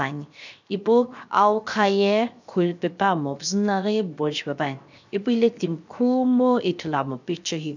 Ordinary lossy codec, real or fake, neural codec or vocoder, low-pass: none; fake; codec, 16 kHz, 0.7 kbps, FocalCodec; 7.2 kHz